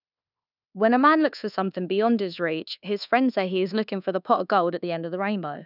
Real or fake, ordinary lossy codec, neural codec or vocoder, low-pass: fake; none; codec, 24 kHz, 1.2 kbps, DualCodec; 5.4 kHz